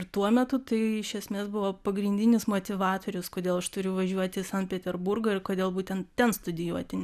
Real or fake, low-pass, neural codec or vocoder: real; 14.4 kHz; none